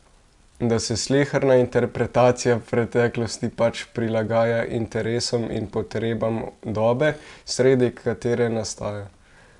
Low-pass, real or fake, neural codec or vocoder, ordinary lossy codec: 10.8 kHz; real; none; none